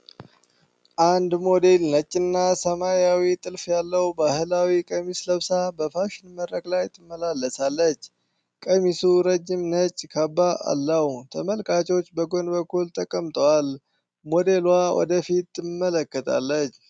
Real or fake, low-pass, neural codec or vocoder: real; 9.9 kHz; none